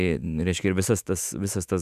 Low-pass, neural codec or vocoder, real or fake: 14.4 kHz; autoencoder, 48 kHz, 128 numbers a frame, DAC-VAE, trained on Japanese speech; fake